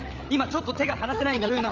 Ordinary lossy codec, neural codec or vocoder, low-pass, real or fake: Opus, 32 kbps; codec, 16 kHz, 16 kbps, FreqCodec, larger model; 7.2 kHz; fake